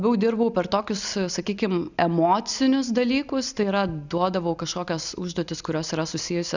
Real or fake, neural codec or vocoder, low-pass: real; none; 7.2 kHz